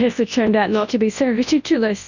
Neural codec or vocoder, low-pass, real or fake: codec, 24 kHz, 0.9 kbps, WavTokenizer, large speech release; 7.2 kHz; fake